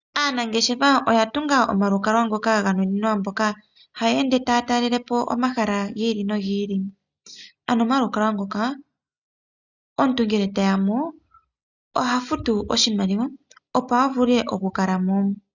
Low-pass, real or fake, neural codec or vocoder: 7.2 kHz; real; none